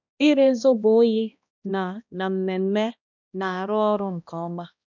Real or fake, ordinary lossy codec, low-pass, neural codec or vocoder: fake; none; 7.2 kHz; codec, 16 kHz, 1 kbps, X-Codec, HuBERT features, trained on balanced general audio